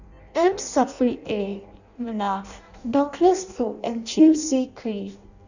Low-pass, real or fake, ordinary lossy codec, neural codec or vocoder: 7.2 kHz; fake; none; codec, 16 kHz in and 24 kHz out, 0.6 kbps, FireRedTTS-2 codec